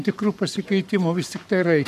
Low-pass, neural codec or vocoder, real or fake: 14.4 kHz; codec, 44.1 kHz, 7.8 kbps, Pupu-Codec; fake